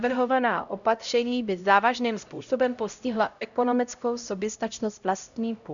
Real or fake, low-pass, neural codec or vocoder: fake; 7.2 kHz; codec, 16 kHz, 0.5 kbps, X-Codec, HuBERT features, trained on LibriSpeech